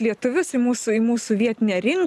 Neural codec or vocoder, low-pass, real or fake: none; 14.4 kHz; real